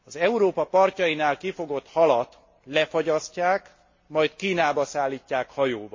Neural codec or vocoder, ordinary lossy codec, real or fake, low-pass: none; MP3, 32 kbps; real; 7.2 kHz